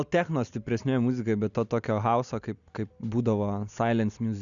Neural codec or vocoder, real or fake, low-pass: none; real; 7.2 kHz